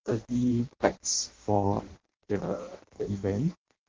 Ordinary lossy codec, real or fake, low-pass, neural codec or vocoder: Opus, 16 kbps; fake; 7.2 kHz; codec, 16 kHz in and 24 kHz out, 0.6 kbps, FireRedTTS-2 codec